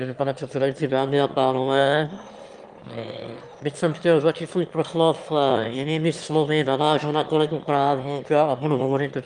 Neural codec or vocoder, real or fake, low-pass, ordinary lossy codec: autoencoder, 22.05 kHz, a latent of 192 numbers a frame, VITS, trained on one speaker; fake; 9.9 kHz; Opus, 32 kbps